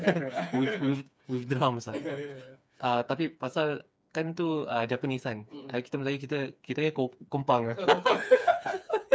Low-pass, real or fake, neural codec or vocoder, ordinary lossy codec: none; fake; codec, 16 kHz, 4 kbps, FreqCodec, smaller model; none